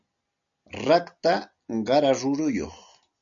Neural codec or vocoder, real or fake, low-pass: none; real; 7.2 kHz